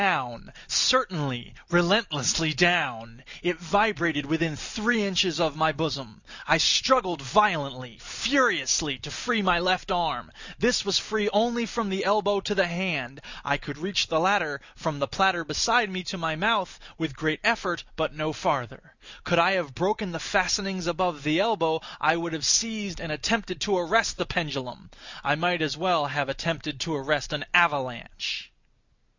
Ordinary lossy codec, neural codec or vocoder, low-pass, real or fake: Opus, 64 kbps; none; 7.2 kHz; real